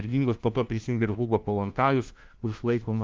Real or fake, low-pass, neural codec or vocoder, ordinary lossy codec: fake; 7.2 kHz; codec, 16 kHz, 1 kbps, FunCodec, trained on LibriTTS, 50 frames a second; Opus, 24 kbps